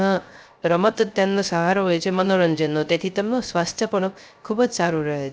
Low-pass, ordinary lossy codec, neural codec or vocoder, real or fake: none; none; codec, 16 kHz, 0.3 kbps, FocalCodec; fake